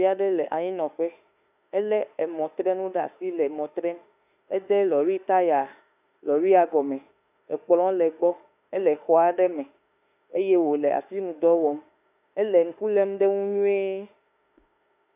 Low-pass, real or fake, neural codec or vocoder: 3.6 kHz; fake; autoencoder, 48 kHz, 32 numbers a frame, DAC-VAE, trained on Japanese speech